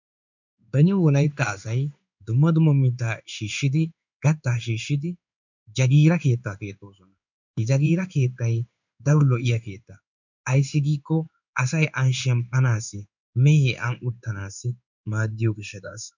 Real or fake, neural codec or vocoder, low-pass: fake; codec, 16 kHz in and 24 kHz out, 1 kbps, XY-Tokenizer; 7.2 kHz